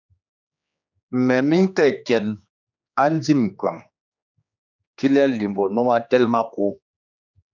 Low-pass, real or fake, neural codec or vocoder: 7.2 kHz; fake; codec, 16 kHz, 2 kbps, X-Codec, HuBERT features, trained on general audio